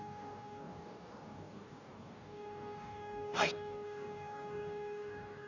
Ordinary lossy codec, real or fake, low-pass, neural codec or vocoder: none; fake; 7.2 kHz; codec, 44.1 kHz, 2.6 kbps, DAC